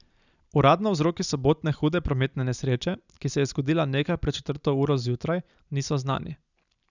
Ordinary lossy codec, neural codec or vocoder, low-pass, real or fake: none; none; 7.2 kHz; real